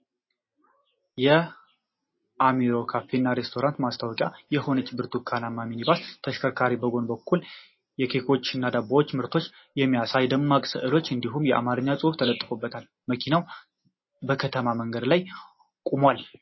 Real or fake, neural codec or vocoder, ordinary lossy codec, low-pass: real; none; MP3, 24 kbps; 7.2 kHz